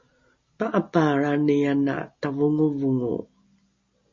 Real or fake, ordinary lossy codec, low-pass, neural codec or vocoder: real; MP3, 32 kbps; 7.2 kHz; none